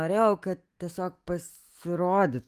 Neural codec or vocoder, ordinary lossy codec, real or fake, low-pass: autoencoder, 48 kHz, 128 numbers a frame, DAC-VAE, trained on Japanese speech; Opus, 24 kbps; fake; 14.4 kHz